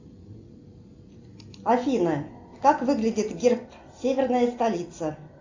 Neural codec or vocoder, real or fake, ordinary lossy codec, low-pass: none; real; AAC, 48 kbps; 7.2 kHz